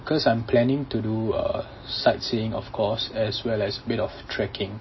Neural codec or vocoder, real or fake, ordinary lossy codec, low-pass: none; real; MP3, 24 kbps; 7.2 kHz